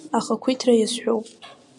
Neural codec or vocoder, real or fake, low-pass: none; real; 10.8 kHz